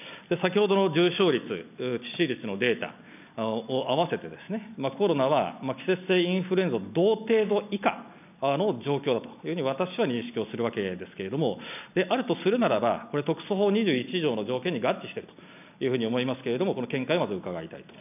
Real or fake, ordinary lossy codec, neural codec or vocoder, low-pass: real; none; none; 3.6 kHz